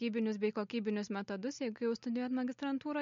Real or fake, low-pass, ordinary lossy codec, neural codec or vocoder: real; 7.2 kHz; MP3, 48 kbps; none